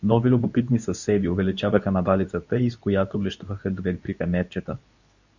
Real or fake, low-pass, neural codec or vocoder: fake; 7.2 kHz; codec, 24 kHz, 0.9 kbps, WavTokenizer, medium speech release version 2